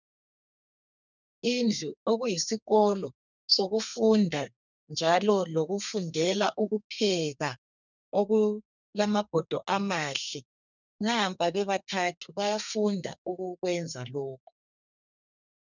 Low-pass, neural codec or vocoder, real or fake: 7.2 kHz; codec, 32 kHz, 1.9 kbps, SNAC; fake